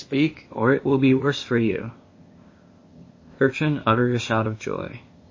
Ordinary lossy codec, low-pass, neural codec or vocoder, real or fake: MP3, 32 kbps; 7.2 kHz; codec, 16 kHz, 0.8 kbps, ZipCodec; fake